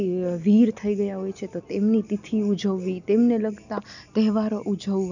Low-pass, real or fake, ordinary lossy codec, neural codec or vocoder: 7.2 kHz; real; none; none